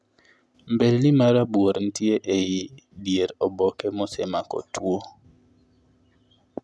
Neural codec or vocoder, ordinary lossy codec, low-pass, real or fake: none; none; none; real